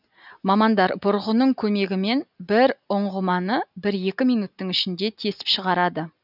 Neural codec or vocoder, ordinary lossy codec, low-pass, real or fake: none; none; 5.4 kHz; real